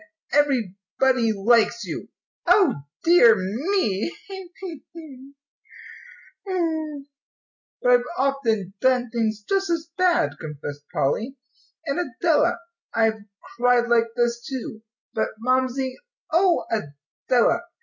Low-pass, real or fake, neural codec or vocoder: 7.2 kHz; real; none